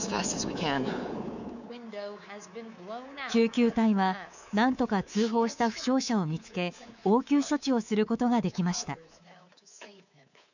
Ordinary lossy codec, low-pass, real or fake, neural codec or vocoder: none; 7.2 kHz; fake; codec, 24 kHz, 3.1 kbps, DualCodec